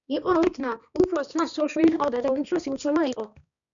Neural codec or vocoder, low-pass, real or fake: codec, 16 kHz, 2 kbps, X-Codec, HuBERT features, trained on balanced general audio; 7.2 kHz; fake